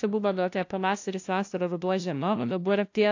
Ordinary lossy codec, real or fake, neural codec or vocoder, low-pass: AAC, 48 kbps; fake; codec, 16 kHz, 0.5 kbps, FunCodec, trained on LibriTTS, 25 frames a second; 7.2 kHz